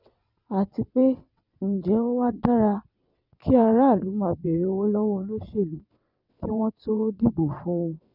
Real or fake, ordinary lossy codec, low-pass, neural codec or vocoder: fake; none; 5.4 kHz; vocoder, 22.05 kHz, 80 mel bands, WaveNeXt